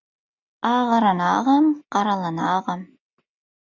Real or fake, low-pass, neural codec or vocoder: real; 7.2 kHz; none